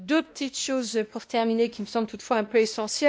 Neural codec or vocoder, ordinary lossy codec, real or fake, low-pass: codec, 16 kHz, 1 kbps, X-Codec, WavLM features, trained on Multilingual LibriSpeech; none; fake; none